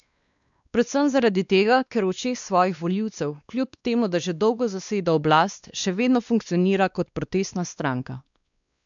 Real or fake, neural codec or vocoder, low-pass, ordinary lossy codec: fake; codec, 16 kHz, 2 kbps, X-Codec, WavLM features, trained on Multilingual LibriSpeech; 7.2 kHz; none